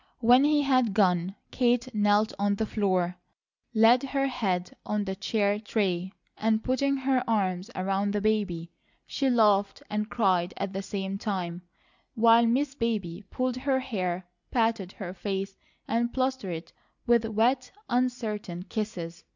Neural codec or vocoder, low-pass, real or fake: none; 7.2 kHz; real